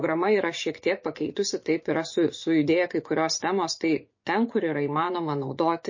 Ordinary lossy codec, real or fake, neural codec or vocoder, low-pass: MP3, 32 kbps; fake; vocoder, 24 kHz, 100 mel bands, Vocos; 7.2 kHz